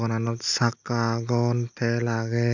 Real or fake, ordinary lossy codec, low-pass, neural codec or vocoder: real; none; 7.2 kHz; none